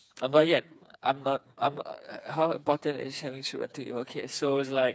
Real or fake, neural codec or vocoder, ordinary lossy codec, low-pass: fake; codec, 16 kHz, 4 kbps, FreqCodec, smaller model; none; none